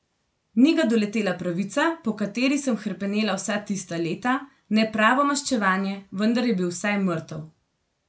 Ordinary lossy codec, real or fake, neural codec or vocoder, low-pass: none; real; none; none